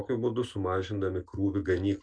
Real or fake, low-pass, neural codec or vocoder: real; 9.9 kHz; none